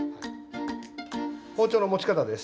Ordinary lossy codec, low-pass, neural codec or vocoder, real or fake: none; none; none; real